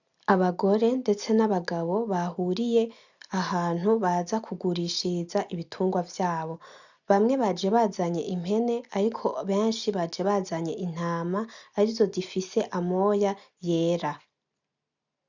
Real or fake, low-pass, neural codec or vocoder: real; 7.2 kHz; none